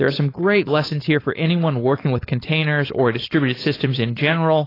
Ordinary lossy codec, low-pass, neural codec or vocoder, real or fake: AAC, 24 kbps; 5.4 kHz; codec, 16 kHz, 4.8 kbps, FACodec; fake